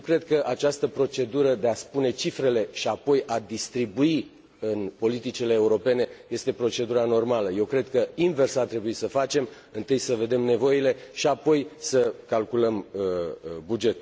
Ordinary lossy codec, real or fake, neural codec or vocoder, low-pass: none; real; none; none